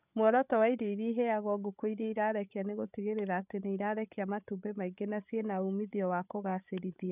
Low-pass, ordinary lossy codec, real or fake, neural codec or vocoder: 3.6 kHz; none; fake; codec, 16 kHz, 8 kbps, FreqCodec, larger model